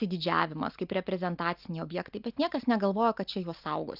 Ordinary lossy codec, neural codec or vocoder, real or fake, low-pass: Opus, 24 kbps; none; real; 5.4 kHz